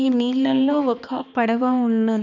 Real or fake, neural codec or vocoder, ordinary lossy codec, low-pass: fake; codec, 16 kHz, 2 kbps, X-Codec, HuBERT features, trained on balanced general audio; none; 7.2 kHz